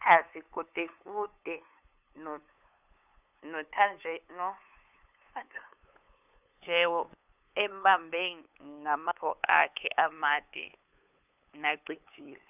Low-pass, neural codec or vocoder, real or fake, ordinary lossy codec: 3.6 kHz; codec, 16 kHz, 8 kbps, FunCodec, trained on LibriTTS, 25 frames a second; fake; none